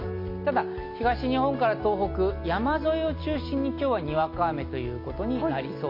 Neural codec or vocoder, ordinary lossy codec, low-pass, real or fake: none; MP3, 32 kbps; 5.4 kHz; real